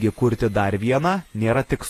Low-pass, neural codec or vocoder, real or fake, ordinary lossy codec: 14.4 kHz; vocoder, 48 kHz, 128 mel bands, Vocos; fake; AAC, 48 kbps